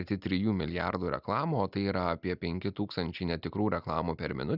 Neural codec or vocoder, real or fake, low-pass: none; real; 5.4 kHz